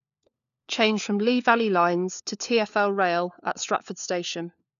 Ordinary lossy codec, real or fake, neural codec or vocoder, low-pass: none; fake; codec, 16 kHz, 4 kbps, FunCodec, trained on LibriTTS, 50 frames a second; 7.2 kHz